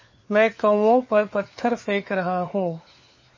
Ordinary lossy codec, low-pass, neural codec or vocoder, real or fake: MP3, 32 kbps; 7.2 kHz; codec, 16 kHz, 4 kbps, FunCodec, trained on LibriTTS, 50 frames a second; fake